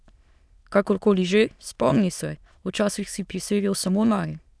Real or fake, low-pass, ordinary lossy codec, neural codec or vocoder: fake; none; none; autoencoder, 22.05 kHz, a latent of 192 numbers a frame, VITS, trained on many speakers